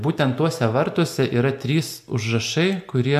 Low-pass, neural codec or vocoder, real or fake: 14.4 kHz; none; real